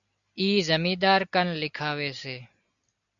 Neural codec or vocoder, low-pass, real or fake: none; 7.2 kHz; real